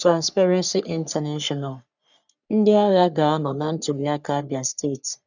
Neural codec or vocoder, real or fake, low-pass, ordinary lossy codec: codec, 44.1 kHz, 3.4 kbps, Pupu-Codec; fake; 7.2 kHz; none